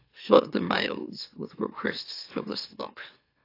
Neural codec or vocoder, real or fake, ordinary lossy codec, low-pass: autoencoder, 44.1 kHz, a latent of 192 numbers a frame, MeloTTS; fake; AAC, 32 kbps; 5.4 kHz